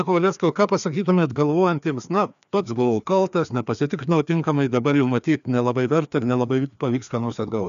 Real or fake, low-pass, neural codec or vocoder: fake; 7.2 kHz; codec, 16 kHz, 2 kbps, FreqCodec, larger model